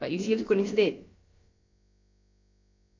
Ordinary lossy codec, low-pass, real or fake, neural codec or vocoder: MP3, 48 kbps; 7.2 kHz; fake; codec, 16 kHz, about 1 kbps, DyCAST, with the encoder's durations